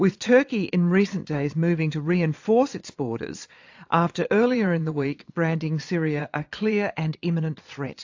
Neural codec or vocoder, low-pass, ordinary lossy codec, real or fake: vocoder, 22.05 kHz, 80 mel bands, Vocos; 7.2 kHz; AAC, 48 kbps; fake